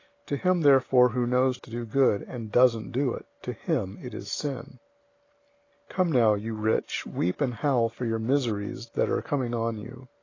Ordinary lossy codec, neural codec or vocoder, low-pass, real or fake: AAC, 32 kbps; none; 7.2 kHz; real